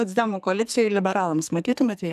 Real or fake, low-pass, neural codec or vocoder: fake; 14.4 kHz; codec, 44.1 kHz, 2.6 kbps, SNAC